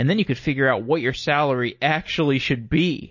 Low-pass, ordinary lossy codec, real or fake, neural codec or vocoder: 7.2 kHz; MP3, 32 kbps; real; none